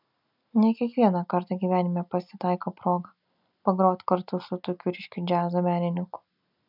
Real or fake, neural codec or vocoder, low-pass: real; none; 5.4 kHz